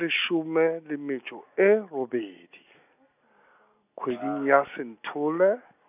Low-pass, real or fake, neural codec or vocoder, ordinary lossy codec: 3.6 kHz; real; none; none